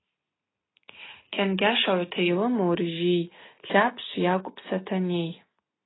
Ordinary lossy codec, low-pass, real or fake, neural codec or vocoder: AAC, 16 kbps; 7.2 kHz; real; none